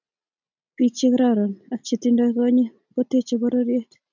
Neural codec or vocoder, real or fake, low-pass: none; real; 7.2 kHz